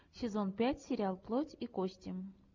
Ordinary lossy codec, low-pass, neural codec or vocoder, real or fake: MP3, 64 kbps; 7.2 kHz; none; real